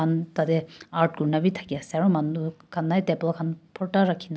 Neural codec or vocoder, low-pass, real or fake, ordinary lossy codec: none; none; real; none